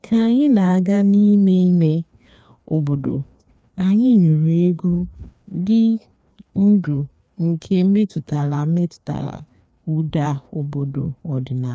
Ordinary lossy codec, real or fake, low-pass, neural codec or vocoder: none; fake; none; codec, 16 kHz, 2 kbps, FreqCodec, larger model